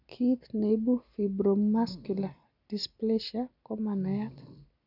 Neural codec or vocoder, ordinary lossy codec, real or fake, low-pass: none; none; real; 5.4 kHz